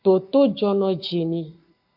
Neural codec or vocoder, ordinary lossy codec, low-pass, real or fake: codec, 44.1 kHz, 7.8 kbps, Pupu-Codec; MP3, 48 kbps; 5.4 kHz; fake